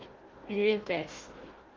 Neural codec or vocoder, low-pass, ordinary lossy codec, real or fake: codec, 16 kHz, 1 kbps, FunCodec, trained on Chinese and English, 50 frames a second; 7.2 kHz; Opus, 16 kbps; fake